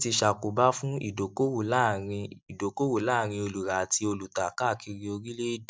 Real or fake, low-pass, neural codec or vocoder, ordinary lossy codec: real; none; none; none